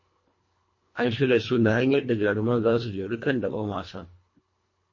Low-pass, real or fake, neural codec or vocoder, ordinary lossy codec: 7.2 kHz; fake; codec, 24 kHz, 1.5 kbps, HILCodec; MP3, 32 kbps